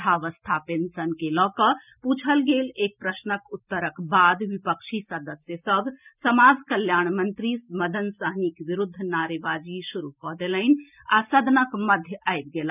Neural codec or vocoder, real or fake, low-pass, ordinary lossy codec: none; real; 3.6 kHz; none